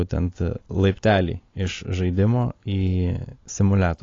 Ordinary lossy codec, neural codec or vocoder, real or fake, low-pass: AAC, 32 kbps; none; real; 7.2 kHz